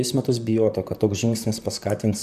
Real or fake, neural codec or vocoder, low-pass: fake; vocoder, 44.1 kHz, 128 mel bands, Pupu-Vocoder; 14.4 kHz